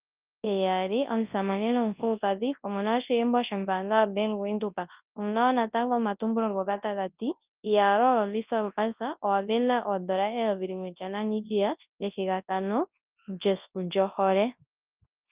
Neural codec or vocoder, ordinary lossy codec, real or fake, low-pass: codec, 24 kHz, 0.9 kbps, WavTokenizer, large speech release; Opus, 24 kbps; fake; 3.6 kHz